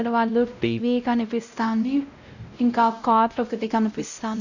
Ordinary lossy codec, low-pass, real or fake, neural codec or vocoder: none; 7.2 kHz; fake; codec, 16 kHz, 0.5 kbps, X-Codec, WavLM features, trained on Multilingual LibriSpeech